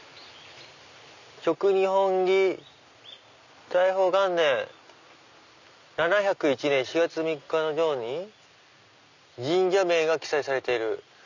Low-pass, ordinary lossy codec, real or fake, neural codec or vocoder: 7.2 kHz; none; real; none